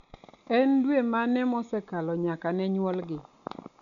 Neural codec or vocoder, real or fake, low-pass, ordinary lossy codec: none; real; 7.2 kHz; none